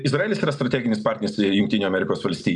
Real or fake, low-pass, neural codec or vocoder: real; 10.8 kHz; none